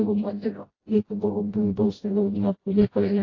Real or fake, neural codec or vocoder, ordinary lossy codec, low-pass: fake; codec, 16 kHz, 0.5 kbps, FreqCodec, smaller model; AAC, 32 kbps; 7.2 kHz